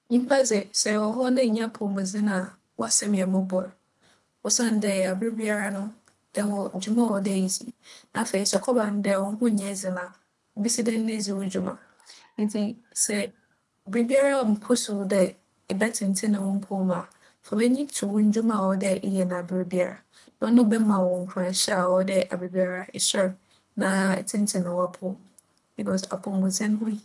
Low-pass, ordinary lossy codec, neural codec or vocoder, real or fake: none; none; codec, 24 kHz, 3 kbps, HILCodec; fake